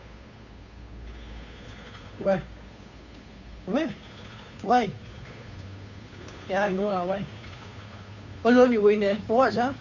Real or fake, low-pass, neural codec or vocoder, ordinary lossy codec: fake; 7.2 kHz; codec, 16 kHz, 2 kbps, FunCodec, trained on Chinese and English, 25 frames a second; none